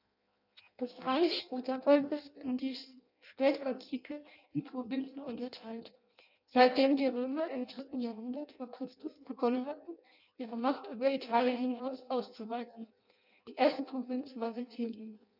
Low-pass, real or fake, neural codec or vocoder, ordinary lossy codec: 5.4 kHz; fake; codec, 16 kHz in and 24 kHz out, 0.6 kbps, FireRedTTS-2 codec; none